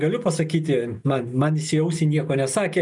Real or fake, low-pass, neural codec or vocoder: real; 10.8 kHz; none